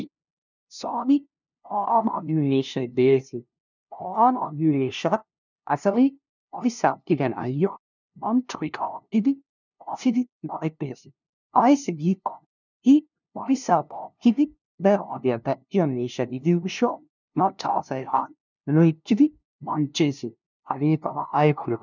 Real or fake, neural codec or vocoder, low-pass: fake; codec, 16 kHz, 0.5 kbps, FunCodec, trained on LibriTTS, 25 frames a second; 7.2 kHz